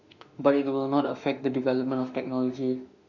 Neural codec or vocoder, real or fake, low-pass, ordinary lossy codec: autoencoder, 48 kHz, 32 numbers a frame, DAC-VAE, trained on Japanese speech; fake; 7.2 kHz; none